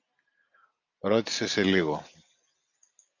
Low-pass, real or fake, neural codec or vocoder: 7.2 kHz; real; none